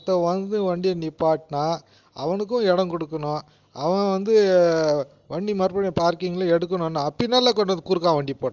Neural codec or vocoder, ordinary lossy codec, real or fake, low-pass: none; Opus, 32 kbps; real; 7.2 kHz